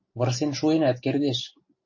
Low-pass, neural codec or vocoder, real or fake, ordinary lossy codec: 7.2 kHz; none; real; MP3, 32 kbps